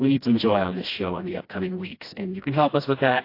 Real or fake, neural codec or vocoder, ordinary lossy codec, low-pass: fake; codec, 16 kHz, 1 kbps, FreqCodec, smaller model; AAC, 32 kbps; 5.4 kHz